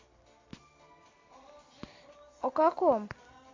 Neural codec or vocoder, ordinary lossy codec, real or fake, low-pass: none; AAC, 32 kbps; real; 7.2 kHz